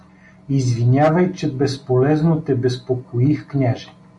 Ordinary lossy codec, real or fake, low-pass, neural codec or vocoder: MP3, 48 kbps; real; 10.8 kHz; none